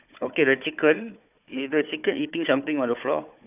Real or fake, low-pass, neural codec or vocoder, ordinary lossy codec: fake; 3.6 kHz; codec, 16 kHz, 4 kbps, FunCodec, trained on Chinese and English, 50 frames a second; none